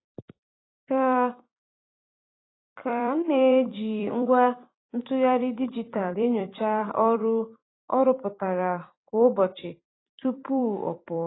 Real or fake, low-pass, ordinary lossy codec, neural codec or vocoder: fake; 7.2 kHz; AAC, 16 kbps; vocoder, 44.1 kHz, 128 mel bands every 256 samples, BigVGAN v2